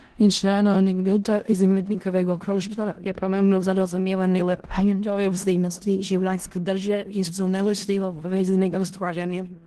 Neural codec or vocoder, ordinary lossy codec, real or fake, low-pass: codec, 16 kHz in and 24 kHz out, 0.4 kbps, LongCat-Audio-Codec, four codebook decoder; Opus, 16 kbps; fake; 10.8 kHz